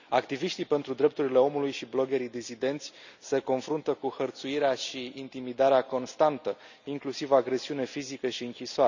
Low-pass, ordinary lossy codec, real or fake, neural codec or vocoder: 7.2 kHz; none; real; none